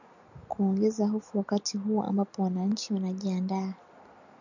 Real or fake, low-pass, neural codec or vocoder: real; 7.2 kHz; none